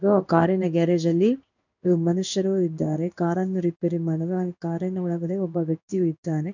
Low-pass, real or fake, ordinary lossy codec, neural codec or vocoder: 7.2 kHz; fake; none; codec, 16 kHz in and 24 kHz out, 1 kbps, XY-Tokenizer